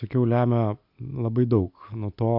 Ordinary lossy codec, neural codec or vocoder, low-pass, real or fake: AAC, 48 kbps; none; 5.4 kHz; real